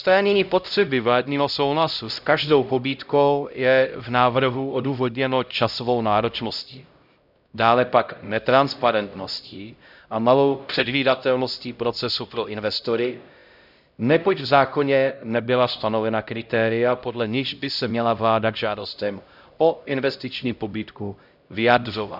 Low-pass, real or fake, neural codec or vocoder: 5.4 kHz; fake; codec, 16 kHz, 0.5 kbps, X-Codec, HuBERT features, trained on LibriSpeech